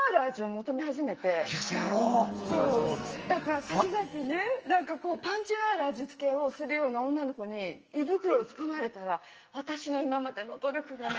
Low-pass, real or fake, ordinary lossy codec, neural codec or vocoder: 7.2 kHz; fake; Opus, 24 kbps; codec, 44.1 kHz, 2.6 kbps, SNAC